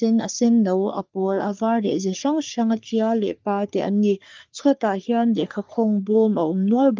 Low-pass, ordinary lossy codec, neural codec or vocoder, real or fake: 7.2 kHz; Opus, 32 kbps; codec, 44.1 kHz, 3.4 kbps, Pupu-Codec; fake